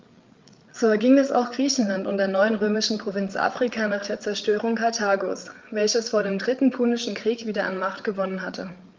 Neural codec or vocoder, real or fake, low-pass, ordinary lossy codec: codec, 16 kHz, 8 kbps, FreqCodec, larger model; fake; 7.2 kHz; Opus, 24 kbps